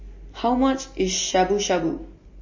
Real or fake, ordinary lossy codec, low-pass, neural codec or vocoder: real; MP3, 32 kbps; 7.2 kHz; none